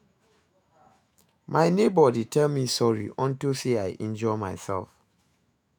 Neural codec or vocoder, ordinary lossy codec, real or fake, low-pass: autoencoder, 48 kHz, 128 numbers a frame, DAC-VAE, trained on Japanese speech; none; fake; none